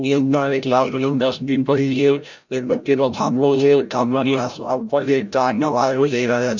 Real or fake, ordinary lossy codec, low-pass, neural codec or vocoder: fake; none; 7.2 kHz; codec, 16 kHz, 0.5 kbps, FreqCodec, larger model